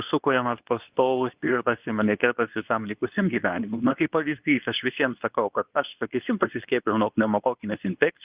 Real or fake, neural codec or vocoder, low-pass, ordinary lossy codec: fake; codec, 24 kHz, 0.9 kbps, WavTokenizer, medium speech release version 2; 3.6 kHz; Opus, 24 kbps